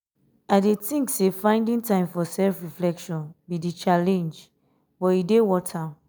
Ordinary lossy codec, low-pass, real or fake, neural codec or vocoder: none; none; real; none